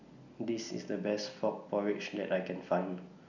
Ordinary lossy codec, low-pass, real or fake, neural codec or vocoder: none; 7.2 kHz; real; none